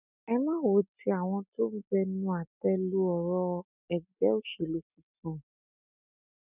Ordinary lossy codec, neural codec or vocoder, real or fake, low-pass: none; none; real; 3.6 kHz